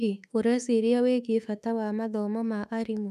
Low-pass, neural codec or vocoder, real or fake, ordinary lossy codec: 14.4 kHz; autoencoder, 48 kHz, 32 numbers a frame, DAC-VAE, trained on Japanese speech; fake; none